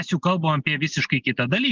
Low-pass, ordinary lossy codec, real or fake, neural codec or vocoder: 7.2 kHz; Opus, 32 kbps; real; none